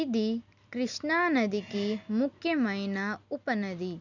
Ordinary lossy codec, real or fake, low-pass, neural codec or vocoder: none; real; 7.2 kHz; none